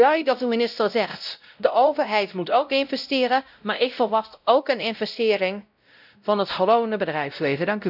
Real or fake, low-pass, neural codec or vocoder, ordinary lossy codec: fake; 5.4 kHz; codec, 16 kHz, 0.5 kbps, X-Codec, WavLM features, trained on Multilingual LibriSpeech; none